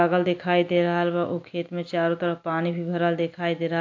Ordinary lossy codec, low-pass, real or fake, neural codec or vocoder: AAC, 48 kbps; 7.2 kHz; real; none